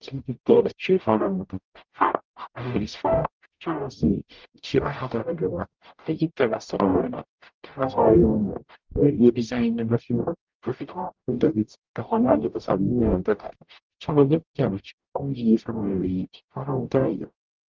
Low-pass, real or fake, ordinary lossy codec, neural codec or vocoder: 7.2 kHz; fake; Opus, 32 kbps; codec, 44.1 kHz, 0.9 kbps, DAC